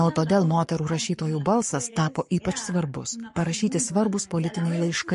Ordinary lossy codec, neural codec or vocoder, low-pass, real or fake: MP3, 48 kbps; codec, 44.1 kHz, 7.8 kbps, Pupu-Codec; 14.4 kHz; fake